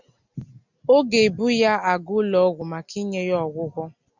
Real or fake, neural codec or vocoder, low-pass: real; none; 7.2 kHz